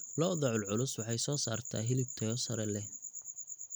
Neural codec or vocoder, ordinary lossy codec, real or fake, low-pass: none; none; real; none